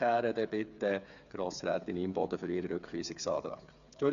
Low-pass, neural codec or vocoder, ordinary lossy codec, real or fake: 7.2 kHz; codec, 16 kHz, 8 kbps, FreqCodec, smaller model; none; fake